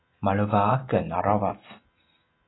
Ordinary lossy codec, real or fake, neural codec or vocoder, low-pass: AAC, 16 kbps; real; none; 7.2 kHz